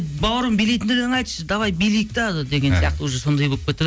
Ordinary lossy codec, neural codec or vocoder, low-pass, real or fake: none; none; none; real